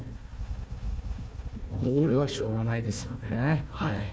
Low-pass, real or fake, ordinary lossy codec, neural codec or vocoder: none; fake; none; codec, 16 kHz, 1 kbps, FunCodec, trained on Chinese and English, 50 frames a second